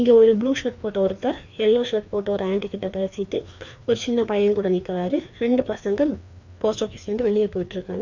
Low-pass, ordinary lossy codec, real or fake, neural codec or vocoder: 7.2 kHz; none; fake; codec, 16 kHz, 2 kbps, FreqCodec, larger model